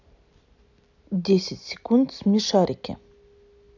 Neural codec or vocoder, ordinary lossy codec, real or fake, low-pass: none; none; real; 7.2 kHz